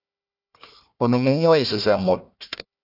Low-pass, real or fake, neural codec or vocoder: 5.4 kHz; fake; codec, 16 kHz, 1 kbps, FunCodec, trained on Chinese and English, 50 frames a second